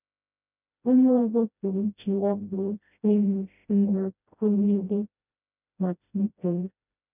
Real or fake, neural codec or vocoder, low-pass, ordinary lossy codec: fake; codec, 16 kHz, 0.5 kbps, FreqCodec, smaller model; 3.6 kHz; none